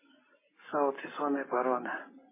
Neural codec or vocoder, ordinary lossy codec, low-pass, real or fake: none; MP3, 16 kbps; 3.6 kHz; real